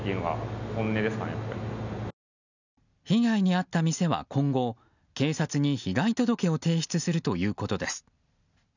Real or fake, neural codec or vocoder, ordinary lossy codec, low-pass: real; none; none; 7.2 kHz